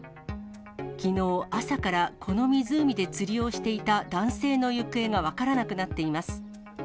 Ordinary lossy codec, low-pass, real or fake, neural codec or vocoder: none; none; real; none